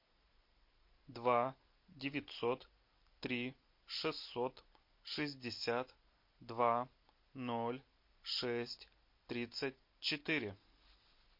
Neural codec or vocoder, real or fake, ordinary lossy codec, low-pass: none; real; MP3, 32 kbps; 5.4 kHz